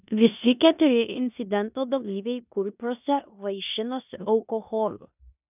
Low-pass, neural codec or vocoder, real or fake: 3.6 kHz; codec, 16 kHz in and 24 kHz out, 0.9 kbps, LongCat-Audio-Codec, four codebook decoder; fake